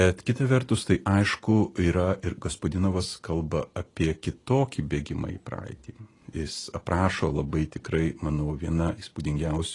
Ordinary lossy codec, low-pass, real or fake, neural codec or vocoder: AAC, 32 kbps; 10.8 kHz; real; none